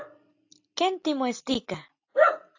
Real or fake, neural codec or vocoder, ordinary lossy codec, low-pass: real; none; AAC, 48 kbps; 7.2 kHz